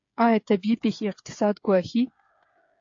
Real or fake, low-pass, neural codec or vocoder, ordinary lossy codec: fake; 7.2 kHz; codec, 16 kHz, 8 kbps, FreqCodec, smaller model; AAC, 48 kbps